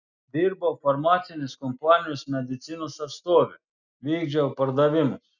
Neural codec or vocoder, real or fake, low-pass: none; real; 7.2 kHz